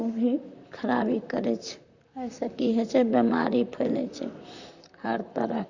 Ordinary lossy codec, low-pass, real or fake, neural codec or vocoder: Opus, 64 kbps; 7.2 kHz; fake; vocoder, 44.1 kHz, 128 mel bands, Pupu-Vocoder